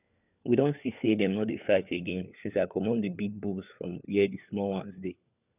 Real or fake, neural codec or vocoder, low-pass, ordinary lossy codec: fake; codec, 16 kHz, 4 kbps, FunCodec, trained on LibriTTS, 50 frames a second; 3.6 kHz; none